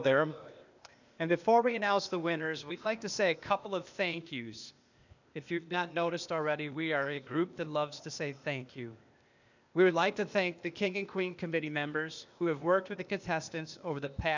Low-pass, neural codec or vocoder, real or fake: 7.2 kHz; codec, 16 kHz, 0.8 kbps, ZipCodec; fake